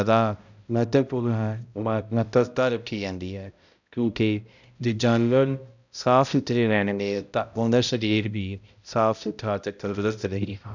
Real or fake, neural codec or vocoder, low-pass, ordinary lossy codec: fake; codec, 16 kHz, 0.5 kbps, X-Codec, HuBERT features, trained on balanced general audio; 7.2 kHz; none